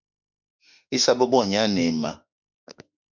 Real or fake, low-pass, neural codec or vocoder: fake; 7.2 kHz; autoencoder, 48 kHz, 32 numbers a frame, DAC-VAE, trained on Japanese speech